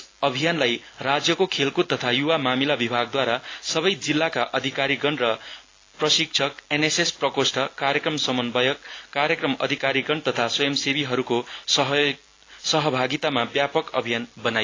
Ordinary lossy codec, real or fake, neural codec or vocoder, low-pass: AAC, 32 kbps; real; none; 7.2 kHz